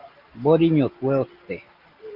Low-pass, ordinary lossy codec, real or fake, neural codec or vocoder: 5.4 kHz; Opus, 32 kbps; real; none